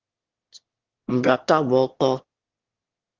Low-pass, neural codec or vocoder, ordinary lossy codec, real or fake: 7.2 kHz; autoencoder, 22.05 kHz, a latent of 192 numbers a frame, VITS, trained on one speaker; Opus, 16 kbps; fake